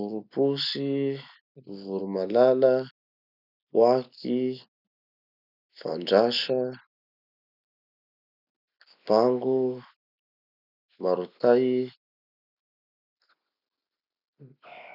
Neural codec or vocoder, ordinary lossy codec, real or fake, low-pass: none; none; real; 5.4 kHz